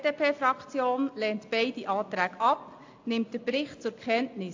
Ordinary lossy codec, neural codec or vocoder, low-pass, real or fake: AAC, 48 kbps; none; 7.2 kHz; real